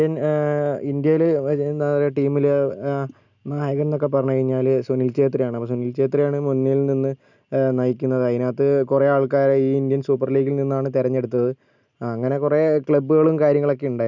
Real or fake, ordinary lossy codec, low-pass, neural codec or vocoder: real; none; 7.2 kHz; none